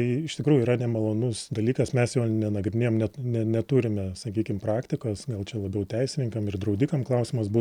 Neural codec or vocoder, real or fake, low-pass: none; real; 19.8 kHz